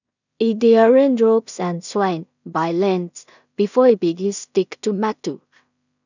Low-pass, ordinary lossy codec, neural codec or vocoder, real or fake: 7.2 kHz; none; codec, 16 kHz in and 24 kHz out, 0.4 kbps, LongCat-Audio-Codec, two codebook decoder; fake